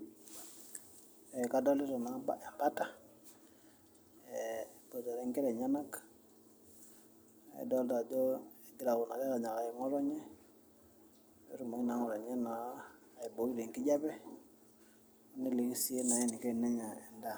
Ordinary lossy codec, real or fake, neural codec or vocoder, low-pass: none; real; none; none